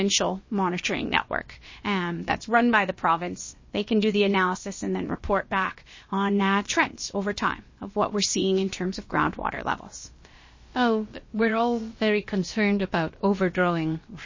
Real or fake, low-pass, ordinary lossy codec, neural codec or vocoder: fake; 7.2 kHz; MP3, 32 kbps; codec, 16 kHz, about 1 kbps, DyCAST, with the encoder's durations